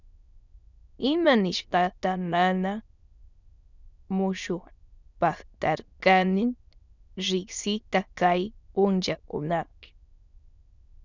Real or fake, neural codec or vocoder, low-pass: fake; autoencoder, 22.05 kHz, a latent of 192 numbers a frame, VITS, trained on many speakers; 7.2 kHz